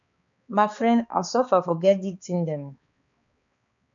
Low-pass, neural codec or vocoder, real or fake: 7.2 kHz; codec, 16 kHz, 2 kbps, X-Codec, HuBERT features, trained on balanced general audio; fake